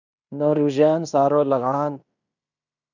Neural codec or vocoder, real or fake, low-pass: codec, 16 kHz in and 24 kHz out, 0.9 kbps, LongCat-Audio-Codec, fine tuned four codebook decoder; fake; 7.2 kHz